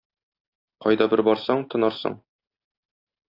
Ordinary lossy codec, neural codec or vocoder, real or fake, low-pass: AAC, 32 kbps; none; real; 5.4 kHz